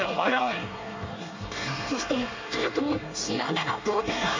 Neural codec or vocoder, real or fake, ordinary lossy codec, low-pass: codec, 24 kHz, 1 kbps, SNAC; fake; MP3, 64 kbps; 7.2 kHz